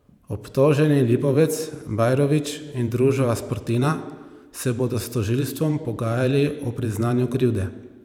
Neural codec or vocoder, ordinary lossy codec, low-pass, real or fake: vocoder, 44.1 kHz, 128 mel bands every 512 samples, BigVGAN v2; none; 19.8 kHz; fake